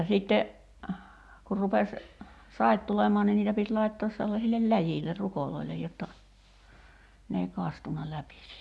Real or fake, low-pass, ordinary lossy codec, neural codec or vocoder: real; none; none; none